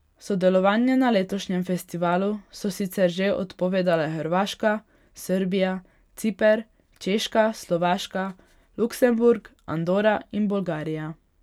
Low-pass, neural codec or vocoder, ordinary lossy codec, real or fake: 19.8 kHz; none; none; real